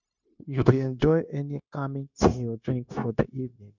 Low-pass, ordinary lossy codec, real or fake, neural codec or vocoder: 7.2 kHz; none; fake; codec, 16 kHz, 0.9 kbps, LongCat-Audio-Codec